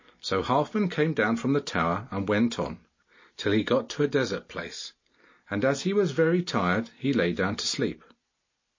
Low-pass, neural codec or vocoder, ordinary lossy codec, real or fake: 7.2 kHz; none; MP3, 32 kbps; real